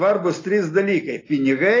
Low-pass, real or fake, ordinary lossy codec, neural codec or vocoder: 7.2 kHz; real; AAC, 32 kbps; none